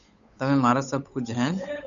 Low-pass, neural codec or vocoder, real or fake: 7.2 kHz; codec, 16 kHz, 8 kbps, FunCodec, trained on Chinese and English, 25 frames a second; fake